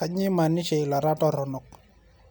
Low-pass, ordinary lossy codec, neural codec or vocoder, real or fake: none; none; none; real